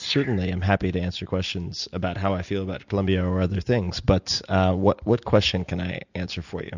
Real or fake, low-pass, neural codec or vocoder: real; 7.2 kHz; none